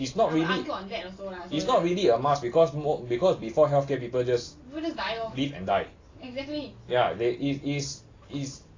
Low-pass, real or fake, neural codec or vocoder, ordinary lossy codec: 7.2 kHz; real; none; AAC, 32 kbps